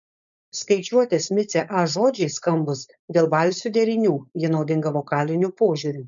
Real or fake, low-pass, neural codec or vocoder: fake; 7.2 kHz; codec, 16 kHz, 4.8 kbps, FACodec